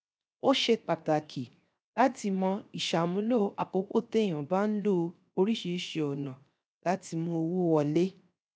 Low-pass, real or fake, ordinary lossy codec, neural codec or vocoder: none; fake; none; codec, 16 kHz, 0.7 kbps, FocalCodec